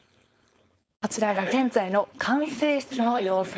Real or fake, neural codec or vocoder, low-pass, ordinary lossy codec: fake; codec, 16 kHz, 4.8 kbps, FACodec; none; none